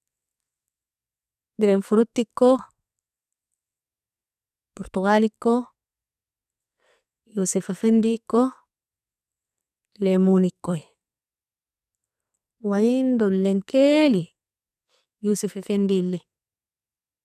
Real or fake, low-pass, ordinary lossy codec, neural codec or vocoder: fake; 14.4 kHz; none; codec, 44.1 kHz, 2.6 kbps, SNAC